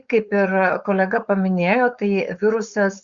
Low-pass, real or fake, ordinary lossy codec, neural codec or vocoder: 7.2 kHz; fake; Opus, 64 kbps; codec, 16 kHz, 4.8 kbps, FACodec